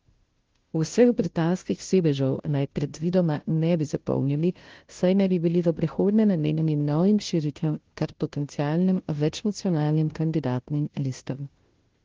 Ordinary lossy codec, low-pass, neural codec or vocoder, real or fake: Opus, 16 kbps; 7.2 kHz; codec, 16 kHz, 0.5 kbps, FunCodec, trained on Chinese and English, 25 frames a second; fake